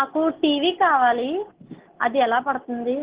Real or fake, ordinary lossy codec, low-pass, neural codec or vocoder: real; Opus, 16 kbps; 3.6 kHz; none